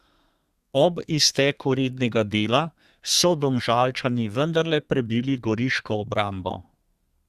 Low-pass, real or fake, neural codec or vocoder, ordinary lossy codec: 14.4 kHz; fake; codec, 32 kHz, 1.9 kbps, SNAC; Opus, 64 kbps